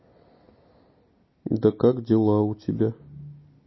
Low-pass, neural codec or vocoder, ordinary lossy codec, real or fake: 7.2 kHz; none; MP3, 24 kbps; real